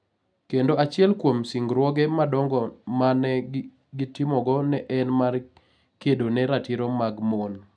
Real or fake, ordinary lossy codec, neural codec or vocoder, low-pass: real; none; none; 9.9 kHz